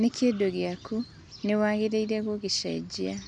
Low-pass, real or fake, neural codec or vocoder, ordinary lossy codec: 10.8 kHz; real; none; none